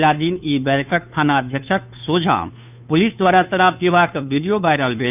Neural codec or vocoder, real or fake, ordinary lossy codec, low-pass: codec, 16 kHz, 2 kbps, FunCodec, trained on Chinese and English, 25 frames a second; fake; none; 3.6 kHz